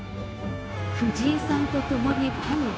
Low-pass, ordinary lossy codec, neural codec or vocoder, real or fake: none; none; codec, 16 kHz, 0.9 kbps, LongCat-Audio-Codec; fake